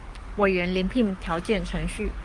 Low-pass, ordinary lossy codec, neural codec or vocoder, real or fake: 10.8 kHz; Opus, 32 kbps; codec, 44.1 kHz, 7.8 kbps, DAC; fake